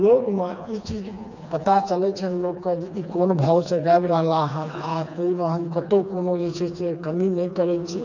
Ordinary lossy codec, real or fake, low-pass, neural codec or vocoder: none; fake; 7.2 kHz; codec, 16 kHz, 2 kbps, FreqCodec, smaller model